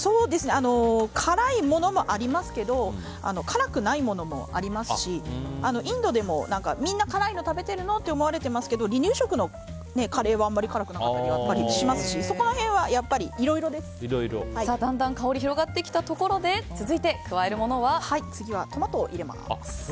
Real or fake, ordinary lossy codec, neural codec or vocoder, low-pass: real; none; none; none